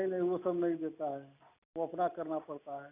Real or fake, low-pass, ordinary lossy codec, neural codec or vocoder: real; 3.6 kHz; none; none